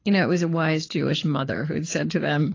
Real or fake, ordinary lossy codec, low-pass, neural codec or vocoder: fake; AAC, 32 kbps; 7.2 kHz; codec, 24 kHz, 6 kbps, HILCodec